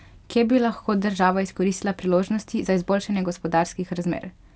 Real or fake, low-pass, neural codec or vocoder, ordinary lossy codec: real; none; none; none